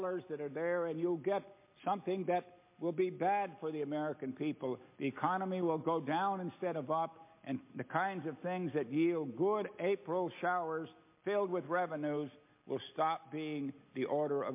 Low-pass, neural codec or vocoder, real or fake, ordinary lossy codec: 3.6 kHz; none; real; MP3, 24 kbps